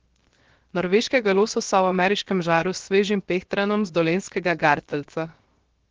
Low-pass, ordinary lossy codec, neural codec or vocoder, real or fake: 7.2 kHz; Opus, 16 kbps; codec, 16 kHz, 0.7 kbps, FocalCodec; fake